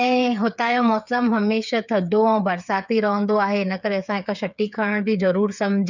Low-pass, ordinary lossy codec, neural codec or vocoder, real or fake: 7.2 kHz; none; codec, 16 kHz, 8 kbps, FreqCodec, smaller model; fake